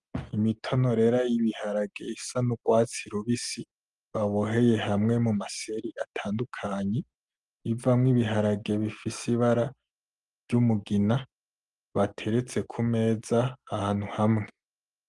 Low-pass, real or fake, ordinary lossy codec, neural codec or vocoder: 10.8 kHz; real; Opus, 32 kbps; none